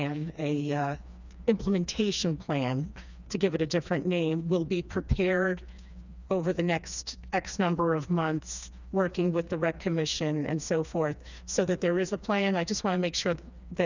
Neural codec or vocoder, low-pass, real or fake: codec, 16 kHz, 2 kbps, FreqCodec, smaller model; 7.2 kHz; fake